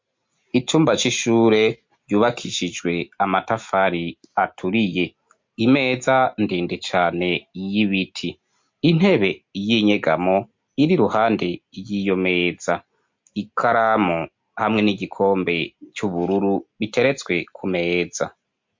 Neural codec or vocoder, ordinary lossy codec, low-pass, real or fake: none; MP3, 48 kbps; 7.2 kHz; real